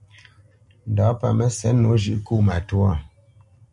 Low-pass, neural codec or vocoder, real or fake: 10.8 kHz; none; real